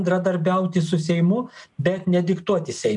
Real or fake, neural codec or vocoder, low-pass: real; none; 10.8 kHz